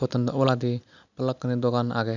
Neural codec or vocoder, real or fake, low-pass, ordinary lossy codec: none; real; 7.2 kHz; none